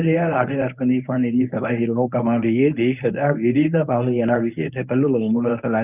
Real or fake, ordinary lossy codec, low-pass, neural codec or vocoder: fake; none; 3.6 kHz; codec, 24 kHz, 0.9 kbps, WavTokenizer, medium speech release version 1